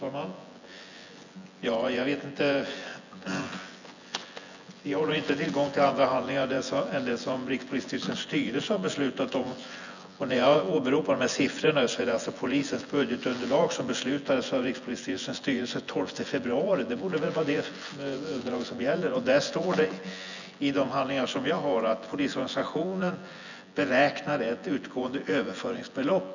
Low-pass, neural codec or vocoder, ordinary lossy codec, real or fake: 7.2 kHz; vocoder, 24 kHz, 100 mel bands, Vocos; none; fake